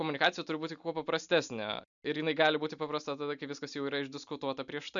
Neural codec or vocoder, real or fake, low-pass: none; real; 7.2 kHz